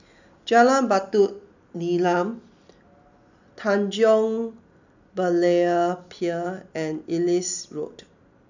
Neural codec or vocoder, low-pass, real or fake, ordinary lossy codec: none; 7.2 kHz; real; none